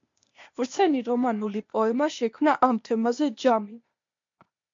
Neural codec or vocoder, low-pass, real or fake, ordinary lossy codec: codec, 16 kHz, 0.8 kbps, ZipCodec; 7.2 kHz; fake; MP3, 48 kbps